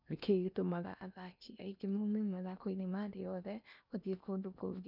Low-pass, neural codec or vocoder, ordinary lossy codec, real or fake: 5.4 kHz; codec, 16 kHz in and 24 kHz out, 0.8 kbps, FocalCodec, streaming, 65536 codes; none; fake